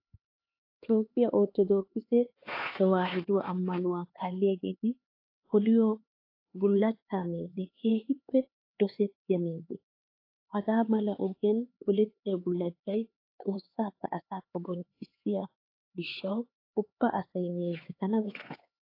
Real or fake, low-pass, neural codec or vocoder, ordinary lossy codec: fake; 5.4 kHz; codec, 16 kHz, 4 kbps, X-Codec, HuBERT features, trained on LibriSpeech; AAC, 32 kbps